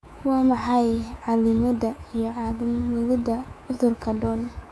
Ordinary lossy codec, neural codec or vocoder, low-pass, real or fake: none; codec, 24 kHz, 3.1 kbps, DualCodec; none; fake